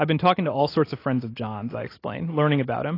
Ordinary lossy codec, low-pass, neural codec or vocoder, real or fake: AAC, 24 kbps; 5.4 kHz; none; real